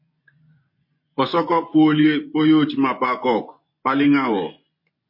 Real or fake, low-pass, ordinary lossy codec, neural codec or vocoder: fake; 5.4 kHz; MP3, 32 kbps; vocoder, 24 kHz, 100 mel bands, Vocos